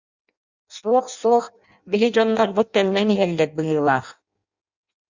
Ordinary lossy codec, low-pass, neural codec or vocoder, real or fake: Opus, 64 kbps; 7.2 kHz; codec, 16 kHz in and 24 kHz out, 0.6 kbps, FireRedTTS-2 codec; fake